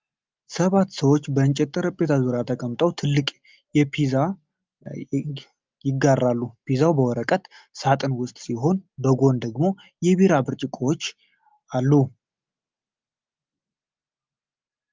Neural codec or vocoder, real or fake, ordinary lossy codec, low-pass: none; real; Opus, 24 kbps; 7.2 kHz